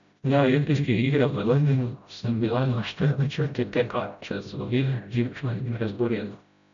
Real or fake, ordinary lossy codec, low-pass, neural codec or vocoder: fake; none; 7.2 kHz; codec, 16 kHz, 0.5 kbps, FreqCodec, smaller model